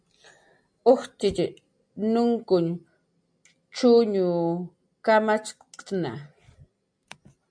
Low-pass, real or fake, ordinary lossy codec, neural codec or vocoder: 9.9 kHz; real; MP3, 64 kbps; none